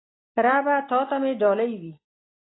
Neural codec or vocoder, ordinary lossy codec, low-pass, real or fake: none; AAC, 16 kbps; 7.2 kHz; real